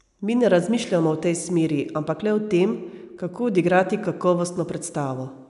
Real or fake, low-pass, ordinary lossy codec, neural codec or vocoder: real; 10.8 kHz; none; none